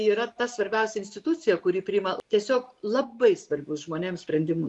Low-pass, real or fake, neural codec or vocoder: 10.8 kHz; real; none